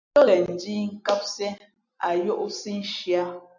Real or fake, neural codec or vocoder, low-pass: real; none; 7.2 kHz